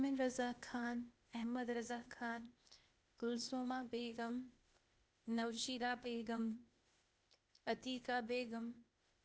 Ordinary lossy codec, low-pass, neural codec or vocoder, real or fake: none; none; codec, 16 kHz, 0.8 kbps, ZipCodec; fake